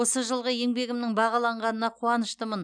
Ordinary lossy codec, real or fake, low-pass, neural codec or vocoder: none; real; 9.9 kHz; none